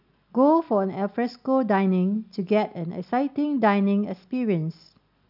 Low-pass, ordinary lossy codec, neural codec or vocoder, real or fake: 5.4 kHz; none; none; real